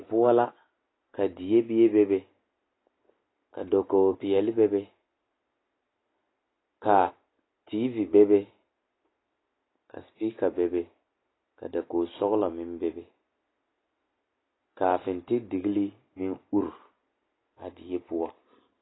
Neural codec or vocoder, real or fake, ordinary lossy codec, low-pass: none; real; AAC, 16 kbps; 7.2 kHz